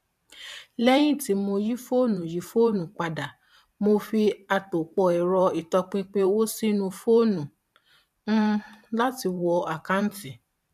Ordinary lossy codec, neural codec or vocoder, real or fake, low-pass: none; vocoder, 44.1 kHz, 128 mel bands every 512 samples, BigVGAN v2; fake; 14.4 kHz